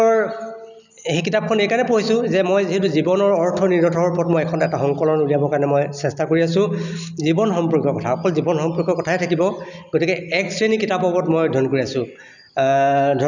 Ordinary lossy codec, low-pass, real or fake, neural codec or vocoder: none; 7.2 kHz; real; none